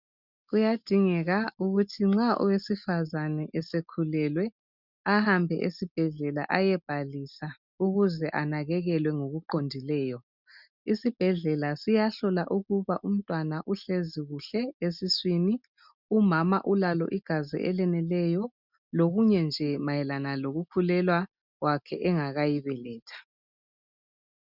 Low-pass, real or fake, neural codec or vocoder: 5.4 kHz; real; none